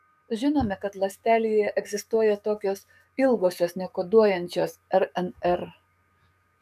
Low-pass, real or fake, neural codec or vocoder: 14.4 kHz; fake; codec, 44.1 kHz, 7.8 kbps, DAC